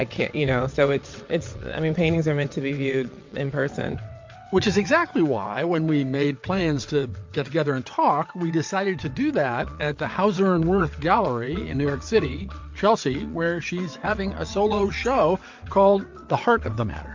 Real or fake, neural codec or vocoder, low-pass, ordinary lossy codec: fake; vocoder, 22.05 kHz, 80 mel bands, WaveNeXt; 7.2 kHz; MP3, 48 kbps